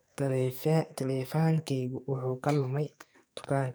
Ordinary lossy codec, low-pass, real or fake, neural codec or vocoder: none; none; fake; codec, 44.1 kHz, 2.6 kbps, SNAC